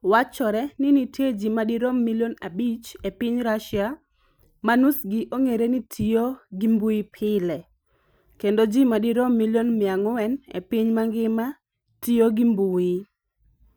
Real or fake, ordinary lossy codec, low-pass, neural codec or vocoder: real; none; none; none